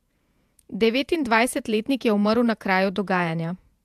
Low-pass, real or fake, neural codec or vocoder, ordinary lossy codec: 14.4 kHz; fake; vocoder, 48 kHz, 128 mel bands, Vocos; AAC, 96 kbps